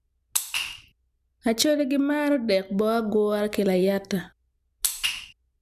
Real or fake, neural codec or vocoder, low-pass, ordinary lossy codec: real; none; 14.4 kHz; none